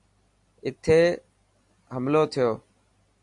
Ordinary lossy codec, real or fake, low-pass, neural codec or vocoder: AAC, 64 kbps; real; 10.8 kHz; none